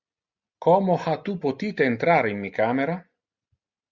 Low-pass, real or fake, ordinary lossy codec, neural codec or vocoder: 7.2 kHz; real; Opus, 64 kbps; none